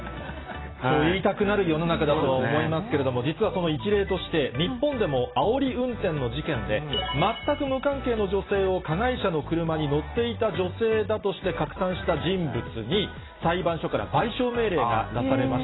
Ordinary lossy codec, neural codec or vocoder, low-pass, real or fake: AAC, 16 kbps; none; 7.2 kHz; real